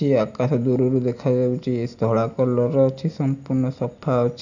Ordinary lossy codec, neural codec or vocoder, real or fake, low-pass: none; none; real; 7.2 kHz